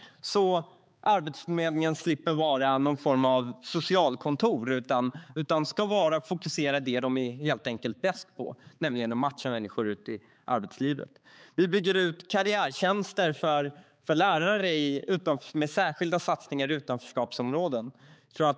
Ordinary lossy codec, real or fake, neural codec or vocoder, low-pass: none; fake; codec, 16 kHz, 4 kbps, X-Codec, HuBERT features, trained on balanced general audio; none